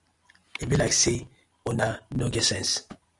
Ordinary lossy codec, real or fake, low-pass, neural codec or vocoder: Opus, 64 kbps; real; 10.8 kHz; none